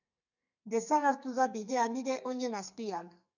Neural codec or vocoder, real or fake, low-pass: codec, 32 kHz, 1.9 kbps, SNAC; fake; 7.2 kHz